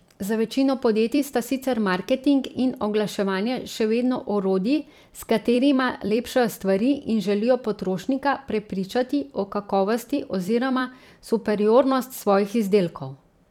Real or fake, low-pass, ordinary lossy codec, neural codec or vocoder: fake; 19.8 kHz; none; vocoder, 44.1 kHz, 128 mel bands every 256 samples, BigVGAN v2